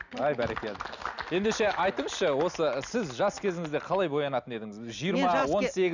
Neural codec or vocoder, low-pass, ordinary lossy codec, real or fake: none; 7.2 kHz; none; real